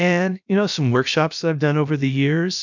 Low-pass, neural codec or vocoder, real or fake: 7.2 kHz; codec, 16 kHz, about 1 kbps, DyCAST, with the encoder's durations; fake